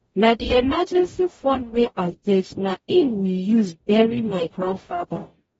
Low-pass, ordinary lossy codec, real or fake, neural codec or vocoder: 19.8 kHz; AAC, 24 kbps; fake; codec, 44.1 kHz, 0.9 kbps, DAC